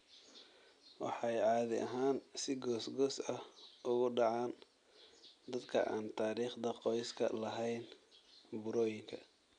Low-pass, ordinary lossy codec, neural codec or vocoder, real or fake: 9.9 kHz; none; none; real